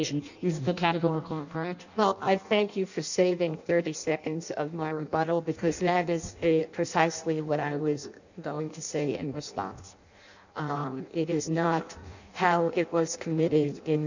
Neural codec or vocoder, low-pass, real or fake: codec, 16 kHz in and 24 kHz out, 0.6 kbps, FireRedTTS-2 codec; 7.2 kHz; fake